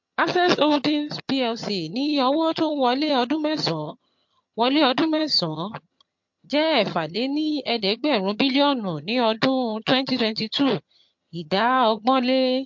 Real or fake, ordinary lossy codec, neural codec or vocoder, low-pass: fake; MP3, 48 kbps; vocoder, 22.05 kHz, 80 mel bands, HiFi-GAN; 7.2 kHz